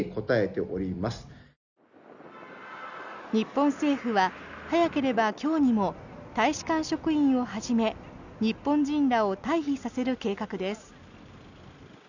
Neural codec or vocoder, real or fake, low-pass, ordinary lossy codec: none; real; 7.2 kHz; none